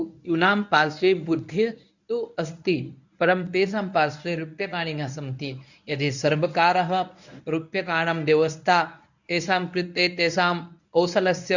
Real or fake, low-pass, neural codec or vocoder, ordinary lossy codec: fake; 7.2 kHz; codec, 24 kHz, 0.9 kbps, WavTokenizer, medium speech release version 1; none